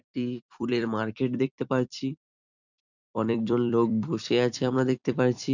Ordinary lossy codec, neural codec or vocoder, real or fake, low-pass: none; vocoder, 44.1 kHz, 128 mel bands every 256 samples, BigVGAN v2; fake; 7.2 kHz